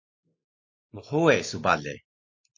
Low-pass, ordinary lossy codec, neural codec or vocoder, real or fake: 7.2 kHz; MP3, 32 kbps; none; real